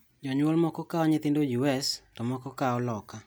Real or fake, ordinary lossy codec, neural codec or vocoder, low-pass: real; none; none; none